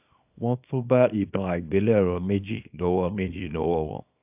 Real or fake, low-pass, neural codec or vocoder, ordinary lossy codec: fake; 3.6 kHz; codec, 24 kHz, 0.9 kbps, WavTokenizer, small release; AAC, 32 kbps